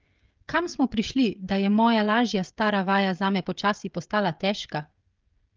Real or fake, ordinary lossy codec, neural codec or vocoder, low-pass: fake; Opus, 32 kbps; codec, 16 kHz, 16 kbps, FreqCodec, smaller model; 7.2 kHz